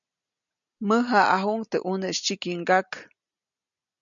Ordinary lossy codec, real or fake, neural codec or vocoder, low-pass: MP3, 96 kbps; real; none; 7.2 kHz